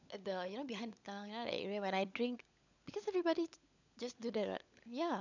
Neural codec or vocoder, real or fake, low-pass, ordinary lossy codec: codec, 16 kHz, 16 kbps, FunCodec, trained on LibriTTS, 50 frames a second; fake; 7.2 kHz; none